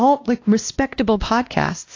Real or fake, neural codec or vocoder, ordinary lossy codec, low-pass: fake; codec, 16 kHz, 1 kbps, X-Codec, WavLM features, trained on Multilingual LibriSpeech; AAC, 48 kbps; 7.2 kHz